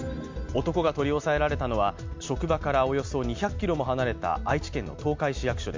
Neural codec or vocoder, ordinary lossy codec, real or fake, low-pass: none; MP3, 64 kbps; real; 7.2 kHz